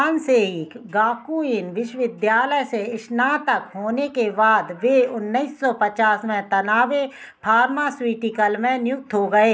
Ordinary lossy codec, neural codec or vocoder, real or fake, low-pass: none; none; real; none